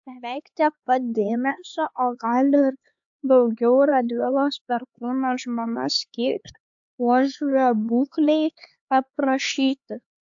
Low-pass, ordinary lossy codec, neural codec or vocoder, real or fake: 7.2 kHz; AAC, 64 kbps; codec, 16 kHz, 4 kbps, X-Codec, HuBERT features, trained on LibriSpeech; fake